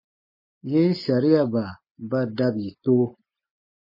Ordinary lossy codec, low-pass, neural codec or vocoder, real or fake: MP3, 24 kbps; 5.4 kHz; none; real